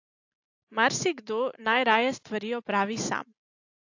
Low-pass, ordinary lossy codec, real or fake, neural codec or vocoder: 7.2 kHz; AAC, 48 kbps; real; none